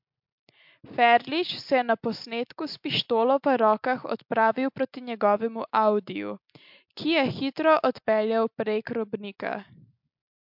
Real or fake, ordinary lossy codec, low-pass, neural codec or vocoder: real; MP3, 48 kbps; 5.4 kHz; none